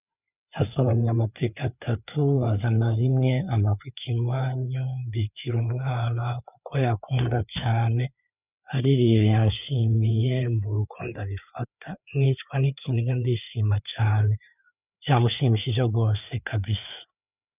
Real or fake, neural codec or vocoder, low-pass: fake; codec, 16 kHz, 4 kbps, FreqCodec, larger model; 3.6 kHz